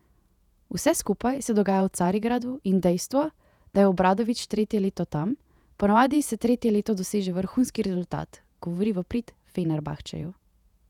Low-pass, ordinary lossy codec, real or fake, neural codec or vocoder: 19.8 kHz; none; fake; vocoder, 48 kHz, 128 mel bands, Vocos